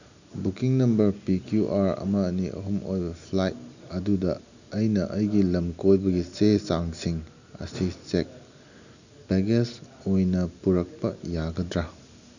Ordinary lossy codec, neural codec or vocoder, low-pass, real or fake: none; none; 7.2 kHz; real